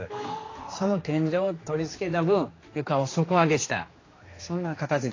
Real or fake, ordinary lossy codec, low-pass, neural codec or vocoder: fake; AAC, 32 kbps; 7.2 kHz; codec, 16 kHz, 2 kbps, X-Codec, HuBERT features, trained on general audio